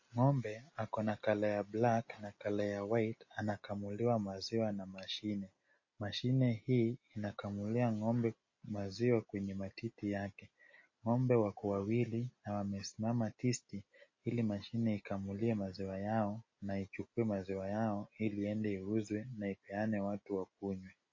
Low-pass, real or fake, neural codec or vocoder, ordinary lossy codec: 7.2 kHz; real; none; MP3, 32 kbps